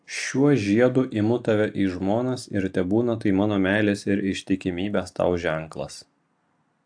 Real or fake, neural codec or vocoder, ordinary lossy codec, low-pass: real; none; AAC, 64 kbps; 9.9 kHz